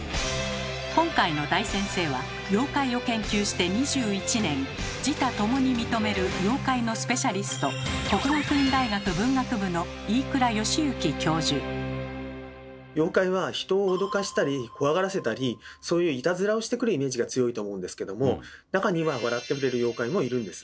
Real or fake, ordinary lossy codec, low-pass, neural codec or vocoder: real; none; none; none